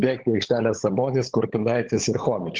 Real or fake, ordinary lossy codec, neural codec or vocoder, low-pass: fake; Opus, 16 kbps; codec, 16 kHz, 16 kbps, FunCodec, trained on LibriTTS, 50 frames a second; 7.2 kHz